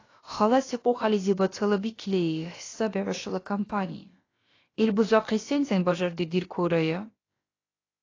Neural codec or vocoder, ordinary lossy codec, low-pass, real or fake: codec, 16 kHz, about 1 kbps, DyCAST, with the encoder's durations; AAC, 32 kbps; 7.2 kHz; fake